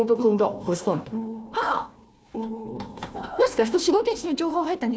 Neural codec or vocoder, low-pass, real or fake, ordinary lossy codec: codec, 16 kHz, 1 kbps, FunCodec, trained on Chinese and English, 50 frames a second; none; fake; none